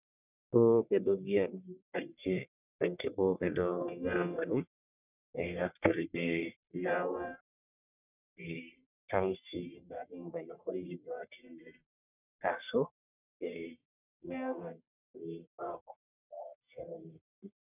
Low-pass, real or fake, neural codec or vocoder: 3.6 kHz; fake; codec, 44.1 kHz, 1.7 kbps, Pupu-Codec